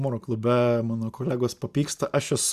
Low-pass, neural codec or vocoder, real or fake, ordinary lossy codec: 14.4 kHz; none; real; Opus, 64 kbps